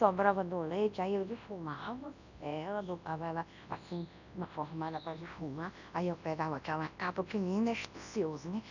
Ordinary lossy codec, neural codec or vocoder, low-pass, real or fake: none; codec, 24 kHz, 0.9 kbps, WavTokenizer, large speech release; 7.2 kHz; fake